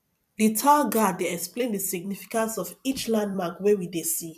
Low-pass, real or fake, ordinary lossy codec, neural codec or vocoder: 14.4 kHz; fake; none; vocoder, 44.1 kHz, 128 mel bands every 256 samples, BigVGAN v2